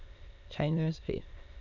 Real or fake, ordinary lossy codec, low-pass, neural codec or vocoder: fake; none; 7.2 kHz; autoencoder, 22.05 kHz, a latent of 192 numbers a frame, VITS, trained on many speakers